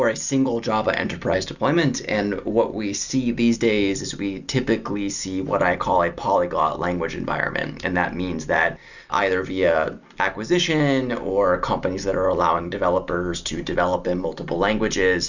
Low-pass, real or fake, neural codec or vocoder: 7.2 kHz; real; none